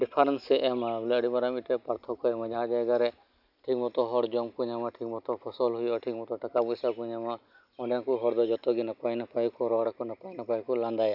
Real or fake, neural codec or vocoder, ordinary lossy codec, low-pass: real; none; none; 5.4 kHz